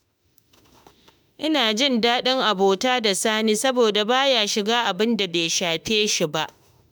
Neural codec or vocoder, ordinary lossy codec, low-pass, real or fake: autoencoder, 48 kHz, 32 numbers a frame, DAC-VAE, trained on Japanese speech; none; none; fake